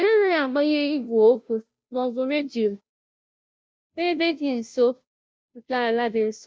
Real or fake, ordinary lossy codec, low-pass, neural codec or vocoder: fake; none; none; codec, 16 kHz, 0.5 kbps, FunCodec, trained on Chinese and English, 25 frames a second